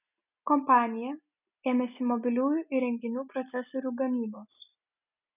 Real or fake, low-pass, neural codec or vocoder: real; 3.6 kHz; none